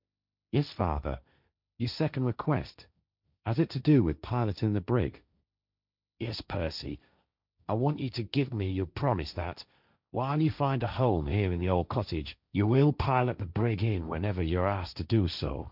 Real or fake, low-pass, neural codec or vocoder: fake; 5.4 kHz; codec, 16 kHz, 1.1 kbps, Voila-Tokenizer